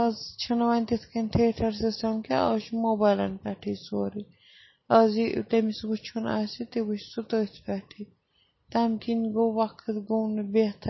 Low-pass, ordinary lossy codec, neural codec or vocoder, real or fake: 7.2 kHz; MP3, 24 kbps; none; real